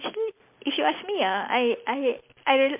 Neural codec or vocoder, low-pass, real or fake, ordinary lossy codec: none; 3.6 kHz; real; MP3, 32 kbps